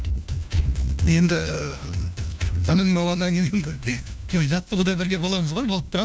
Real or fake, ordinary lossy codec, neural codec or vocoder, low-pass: fake; none; codec, 16 kHz, 1 kbps, FunCodec, trained on LibriTTS, 50 frames a second; none